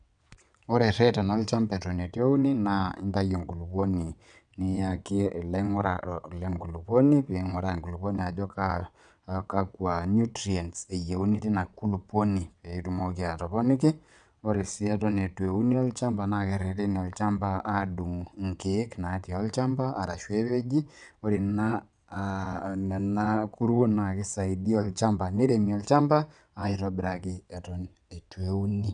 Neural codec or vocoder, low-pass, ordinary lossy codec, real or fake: vocoder, 22.05 kHz, 80 mel bands, WaveNeXt; 9.9 kHz; none; fake